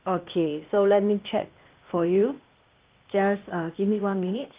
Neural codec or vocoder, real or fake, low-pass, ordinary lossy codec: codec, 16 kHz, 0.8 kbps, ZipCodec; fake; 3.6 kHz; Opus, 32 kbps